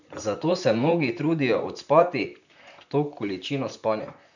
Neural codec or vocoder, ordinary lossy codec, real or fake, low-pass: vocoder, 44.1 kHz, 128 mel bands, Pupu-Vocoder; none; fake; 7.2 kHz